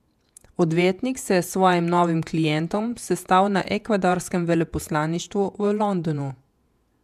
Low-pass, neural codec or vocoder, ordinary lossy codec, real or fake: 14.4 kHz; vocoder, 48 kHz, 128 mel bands, Vocos; MP3, 96 kbps; fake